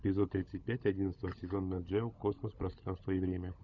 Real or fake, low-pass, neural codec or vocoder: fake; 7.2 kHz; codec, 16 kHz, 16 kbps, FunCodec, trained on LibriTTS, 50 frames a second